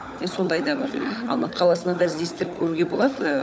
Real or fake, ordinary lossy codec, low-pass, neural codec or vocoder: fake; none; none; codec, 16 kHz, 16 kbps, FunCodec, trained on LibriTTS, 50 frames a second